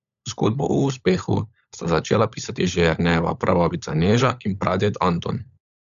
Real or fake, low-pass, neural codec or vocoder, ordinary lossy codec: fake; 7.2 kHz; codec, 16 kHz, 16 kbps, FunCodec, trained on LibriTTS, 50 frames a second; none